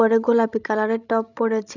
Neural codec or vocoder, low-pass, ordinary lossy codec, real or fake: codec, 16 kHz, 16 kbps, FreqCodec, larger model; 7.2 kHz; none; fake